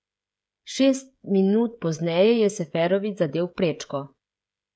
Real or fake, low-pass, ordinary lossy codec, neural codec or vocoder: fake; none; none; codec, 16 kHz, 16 kbps, FreqCodec, smaller model